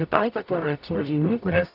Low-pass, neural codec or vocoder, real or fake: 5.4 kHz; codec, 44.1 kHz, 0.9 kbps, DAC; fake